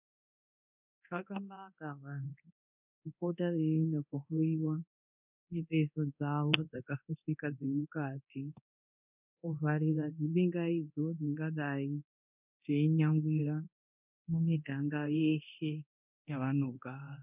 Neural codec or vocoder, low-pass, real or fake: codec, 24 kHz, 0.9 kbps, DualCodec; 3.6 kHz; fake